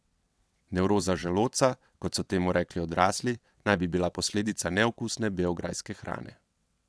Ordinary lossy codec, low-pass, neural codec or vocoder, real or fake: none; none; vocoder, 22.05 kHz, 80 mel bands, WaveNeXt; fake